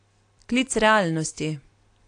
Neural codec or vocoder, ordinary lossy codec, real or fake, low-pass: none; AAC, 48 kbps; real; 9.9 kHz